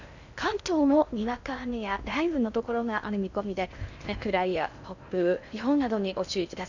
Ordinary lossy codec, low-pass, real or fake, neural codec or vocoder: none; 7.2 kHz; fake; codec, 16 kHz in and 24 kHz out, 0.6 kbps, FocalCodec, streaming, 2048 codes